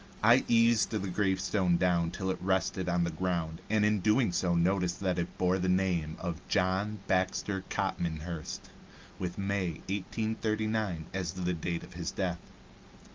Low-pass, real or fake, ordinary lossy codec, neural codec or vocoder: 7.2 kHz; real; Opus, 24 kbps; none